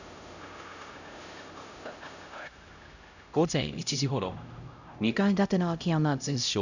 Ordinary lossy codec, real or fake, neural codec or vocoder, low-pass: none; fake; codec, 16 kHz, 0.5 kbps, X-Codec, HuBERT features, trained on LibriSpeech; 7.2 kHz